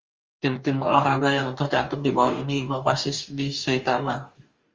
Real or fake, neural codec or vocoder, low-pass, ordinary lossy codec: fake; codec, 44.1 kHz, 2.6 kbps, DAC; 7.2 kHz; Opus, 32 kbps